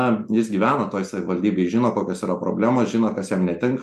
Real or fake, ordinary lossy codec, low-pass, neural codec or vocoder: real; MP3, 96 kbps; 14.4 kHz; none